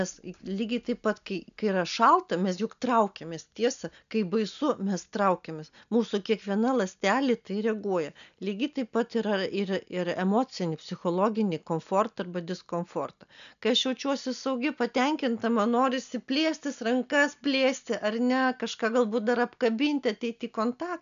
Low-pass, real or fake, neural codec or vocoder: 7.2 kHz; real; none